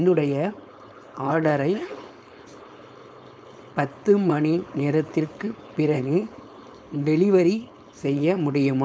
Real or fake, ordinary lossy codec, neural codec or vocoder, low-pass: fake; none; codec, 16 kHz, 4.8 kbps, FACodec; none